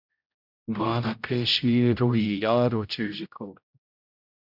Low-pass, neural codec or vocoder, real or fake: 5.4 kHz; codec, 16 kHz, 0.5 kbps, X-Codec, HuBERT features, trained on general audio; fake